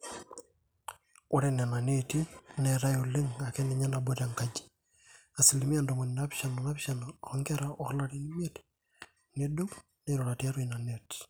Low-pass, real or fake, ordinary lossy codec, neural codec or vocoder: none; real; none; none